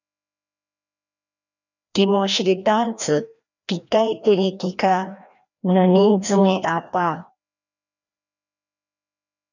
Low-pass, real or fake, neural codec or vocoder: 7.2 kHz; fake; codec, 16 kHz, 1 kbps, FreqCodec, larger model